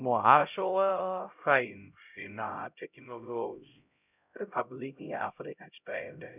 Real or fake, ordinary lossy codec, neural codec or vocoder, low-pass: fake; none; codec, 16 kHz, 0.5 kbps, X-Codec, HuBERT features, trained on LibriSpeech; 3.6 kHz